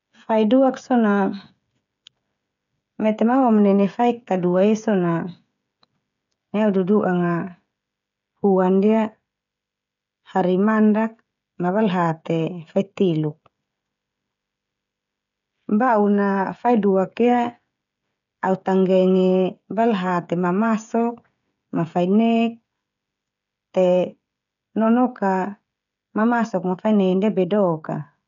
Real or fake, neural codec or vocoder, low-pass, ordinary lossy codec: fake; codec, 16 kHz, 16 kbps, FreqCodec, smaller model; 7.2 kHz; none